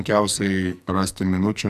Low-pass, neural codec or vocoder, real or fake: 14.4 kHz; codec, 44.1 kHz, 2.6 kbps, SNAC; fake